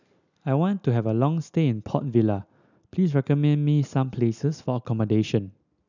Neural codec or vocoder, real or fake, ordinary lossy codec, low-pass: none; real; none; 7.2 kHz